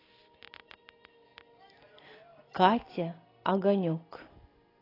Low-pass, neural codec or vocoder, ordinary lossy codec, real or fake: 5.4 kHz; none; AAC, 24 kbps; real